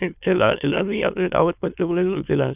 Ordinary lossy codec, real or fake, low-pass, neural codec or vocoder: none; fake; 3.6 kHz; autoencoder, 22.05 kHz, a latent of 192 numbers a frame, VITS, trained on many speakers